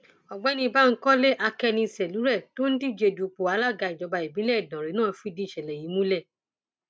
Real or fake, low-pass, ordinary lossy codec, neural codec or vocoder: real; none; none; none